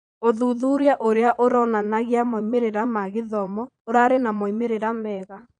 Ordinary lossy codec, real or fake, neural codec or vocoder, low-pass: none; fake; vocoder, 22.05 kHz, 80 mel bands, Vocos; 9.9 kHz